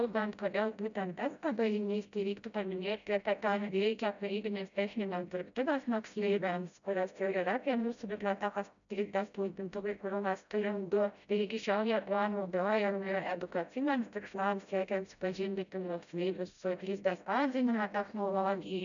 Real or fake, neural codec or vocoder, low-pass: fake; codec, 16 kHz, 0.5 kbps, FreqCodec, smaller model; 7.2 kHz